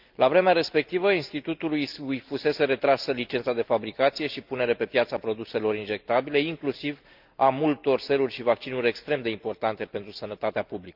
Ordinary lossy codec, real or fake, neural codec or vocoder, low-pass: Opus, 24 kbps; real; none; 5.4 kHz